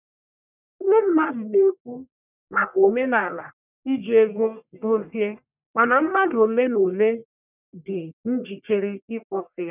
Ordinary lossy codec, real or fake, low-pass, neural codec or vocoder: none; fake; 3.6 kHz; codec, 44.1 kHz, 1.7 kbps, Pupu-Codec